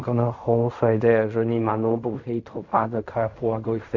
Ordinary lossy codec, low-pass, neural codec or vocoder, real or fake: none; 7.2 kHz; codec, 16 kHz in and 24 kHz out, 0.4 kbps, LongCat-Audio-Codec, fine tuned four codebook decoder; fake